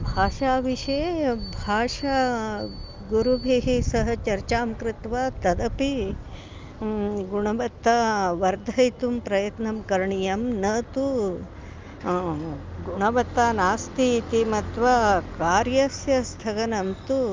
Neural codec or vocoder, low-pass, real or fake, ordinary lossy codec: none; 7.2 kHz; real; Opus, 24 kbps